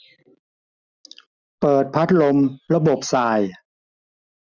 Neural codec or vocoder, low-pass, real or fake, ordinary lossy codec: none; 7.2 kHz; real; none